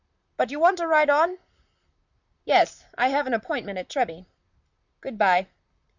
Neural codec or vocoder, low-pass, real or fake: vocoder, 44.1 kHz, 128 mel bands, Pupu-Vocoder; 7.2 kHz; fake